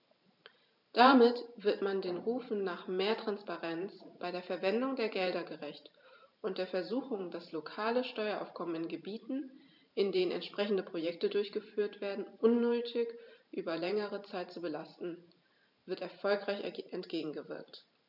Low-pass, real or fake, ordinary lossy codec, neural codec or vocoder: 5.4 kHz; fake; none; vocoder, 44.1 kHz, 128 mel bands every 512 samples, BigVGAN v2